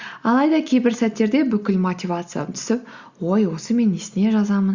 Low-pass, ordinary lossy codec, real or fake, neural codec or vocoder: 7.2 kHz; Opus, 64 kbps; real; none